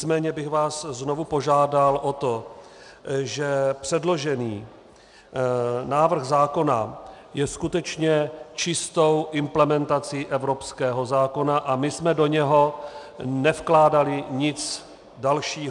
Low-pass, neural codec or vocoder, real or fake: 10.8 kHz; none; real